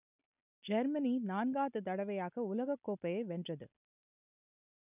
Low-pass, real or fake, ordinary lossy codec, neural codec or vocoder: 3.6 kHz; real; none; none